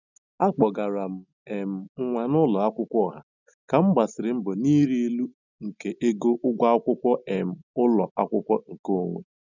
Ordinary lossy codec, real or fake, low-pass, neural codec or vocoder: none; real; 7.2 kHz; none